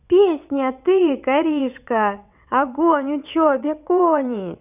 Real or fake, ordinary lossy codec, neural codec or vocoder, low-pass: fake; none; vocoder, 22.05 kHz, 80 mel bands, WaveNeXt; 3.6 kHz